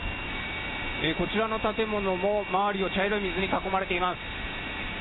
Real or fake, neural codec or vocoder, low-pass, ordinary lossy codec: real; none; 7.2 kHz; AAC, 16 kbps